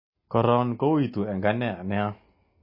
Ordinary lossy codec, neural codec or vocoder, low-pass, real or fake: MP3, 24 kbps; none; 5.4 kHz; real